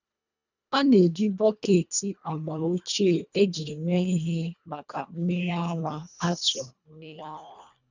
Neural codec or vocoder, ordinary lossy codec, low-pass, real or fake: codec, 24 kHz, 1.5 kbps, HILCodec; none; 7.2 kHz; fake